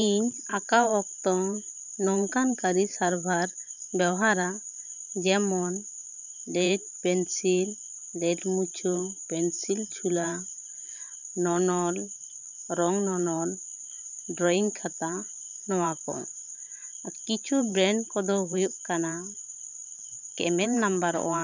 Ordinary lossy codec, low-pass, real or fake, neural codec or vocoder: none; 7.2 kHz; fake; vocoder, 44.1 kHz, 128 mel bands every 512 samples, BigVGAN v2